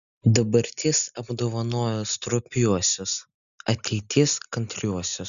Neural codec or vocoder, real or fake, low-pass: none; real; 7.2 kHz